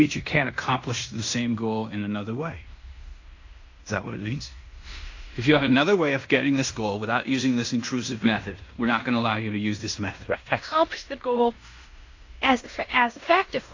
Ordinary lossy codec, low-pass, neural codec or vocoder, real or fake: AAC, 48 kbps; 7.2 kHz; codec, 16 kHz in and 24 kHz out, 0.9 kbps, LongCat-Audio-Codec, fine tuned four codebook decoder; fake